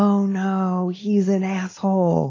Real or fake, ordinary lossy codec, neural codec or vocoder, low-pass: real; AAC, 32 kbps; none; 7.2 kHz